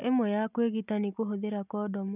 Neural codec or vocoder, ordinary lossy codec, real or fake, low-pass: none; none; real; 3.6 kHz